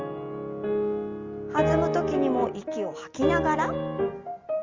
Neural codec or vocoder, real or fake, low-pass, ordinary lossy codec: none; real; 7.2 kHz; Opus, 32 kbps